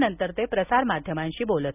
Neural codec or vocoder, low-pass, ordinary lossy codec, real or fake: none; 3.6 kHz; none; real